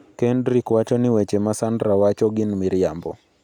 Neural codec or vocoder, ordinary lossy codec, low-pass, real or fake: none; none; 19.8 kHz; real